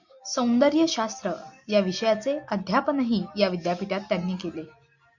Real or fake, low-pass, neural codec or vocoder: real; 7.2 kHz; none